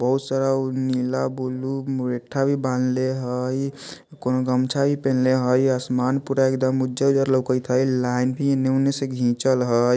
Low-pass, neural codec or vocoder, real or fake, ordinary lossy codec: none; none; real; none